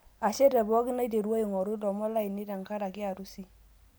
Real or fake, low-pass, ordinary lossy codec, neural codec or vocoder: real; none; none; none